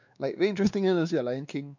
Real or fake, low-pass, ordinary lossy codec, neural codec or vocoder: fake; 7.2 kHz; none; codec, 16 kHz, 2 kbps, X-Codec, WavLM features, trained on Multilingual LibriSpeech